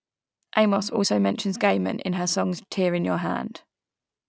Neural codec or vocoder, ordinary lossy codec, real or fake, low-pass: none; none; real; none